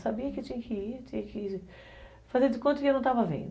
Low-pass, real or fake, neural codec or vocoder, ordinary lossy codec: none; real; none; none